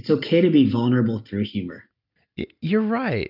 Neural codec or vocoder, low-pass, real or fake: none; 5.4 kHz; real